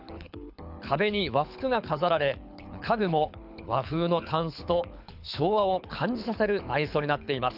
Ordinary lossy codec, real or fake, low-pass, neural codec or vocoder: none; fake; 5.4 kHz; codec, 24 kHz, 6 kbps, HILCodec